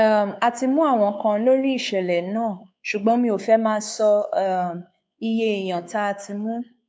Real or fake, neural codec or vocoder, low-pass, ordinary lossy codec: fake; codec, 16 kHz, 4 kbps, X-Codec, WavLM features, trained on Multilingual LibriSpeech; none; none